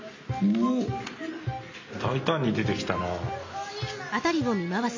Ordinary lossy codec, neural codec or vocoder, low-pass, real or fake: MP3, 48 kbps; none; 7.2 kHz; real